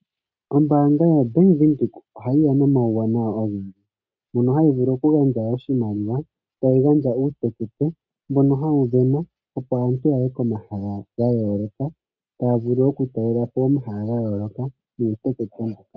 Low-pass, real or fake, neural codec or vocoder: 7.2 kHz; real; none